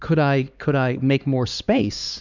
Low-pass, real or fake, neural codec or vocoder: 7.2 kHz; fake; codec, 16 kHz, 2 kbps, X-Codec, HuBERT features, trained on LibriSpeech